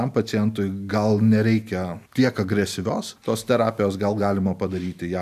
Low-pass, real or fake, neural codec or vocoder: 14.4 kHz; real; none